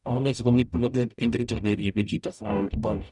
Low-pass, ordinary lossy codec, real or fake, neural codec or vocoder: 10.8 kHz; none; fake; codec, 44.1 kHz, 0.9 kbps, DAC